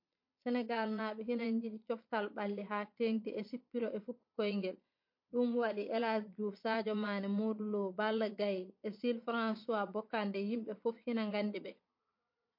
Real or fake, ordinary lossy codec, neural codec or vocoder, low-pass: fake; MP3, 32 kbps; vocoder, 22.05 kHz, 80 mel bands, Vocos; 5.4 kHz